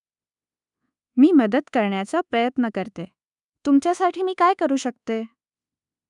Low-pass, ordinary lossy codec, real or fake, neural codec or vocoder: 10.8 kHz; none; fake; codec, 24 kHz, 1.2 kbps, DualCodec